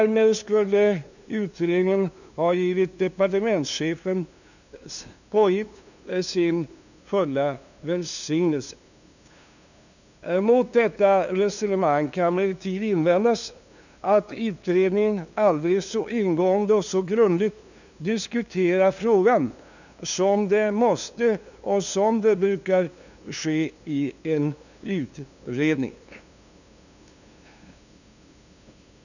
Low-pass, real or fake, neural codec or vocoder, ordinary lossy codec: 7.2 kHz; fake; codec, 16 kHz, 2 kbps, FunCodec, trained on LibriTTS, 25 frames a second; none